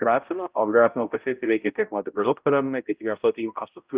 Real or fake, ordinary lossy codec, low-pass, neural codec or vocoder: fake; Opus, 32 kbps; 3.6 kHz; codec, 16 kHz, 0.5 kbps, X-Codec, HuBERT features, trained on balanced general audio